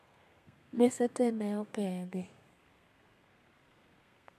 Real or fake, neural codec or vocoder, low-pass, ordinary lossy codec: fake; codec, 32 kHz, 1.9 kbps, SNAC; 14.4 kHz; none